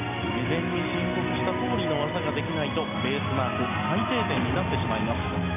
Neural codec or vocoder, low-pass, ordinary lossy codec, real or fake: none; 3.6 kHz; none; real